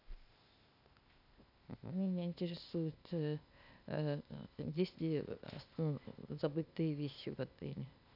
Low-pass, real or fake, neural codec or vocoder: 5.4 kHz; fake; codec, 16 kHz, 0.8 kbps, ZipCodec